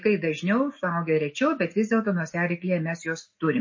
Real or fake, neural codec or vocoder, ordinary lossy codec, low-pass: real; none; MP3, 32 kbps; 7.2 kHz